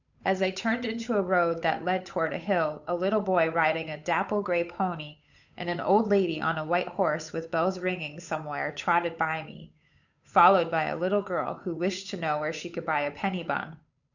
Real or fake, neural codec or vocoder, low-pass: fake; codec, 16 kHz, 8 kbps, FunCodec, trained on Chinese and English, 25 frames a second; 7.2 kHz